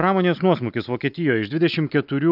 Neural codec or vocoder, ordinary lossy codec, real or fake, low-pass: none; AAC, 48 kbps; real; 5.4 kHz